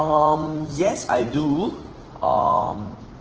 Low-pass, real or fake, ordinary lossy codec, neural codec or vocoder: 7.2 kHz; fake; Opus, 16 kbps; vocoder, 44.1 kHz, 80 mel bands, Vocos